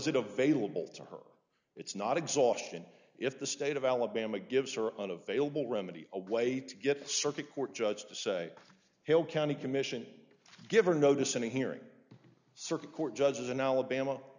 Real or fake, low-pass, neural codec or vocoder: real; 7.2 kHz; none